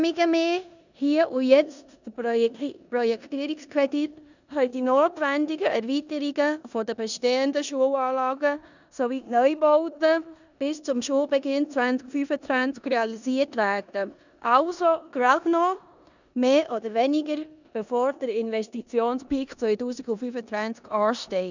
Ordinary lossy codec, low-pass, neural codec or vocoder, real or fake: none; 7.2 kHz; codec, 16 kHz in and 24 kHz out, 0.9 kbps, LongCat-Audio-Codec, four codebook decoder; fake